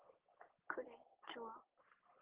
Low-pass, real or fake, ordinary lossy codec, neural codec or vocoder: 3.6 kHz; real; Opus, 16 kbps; none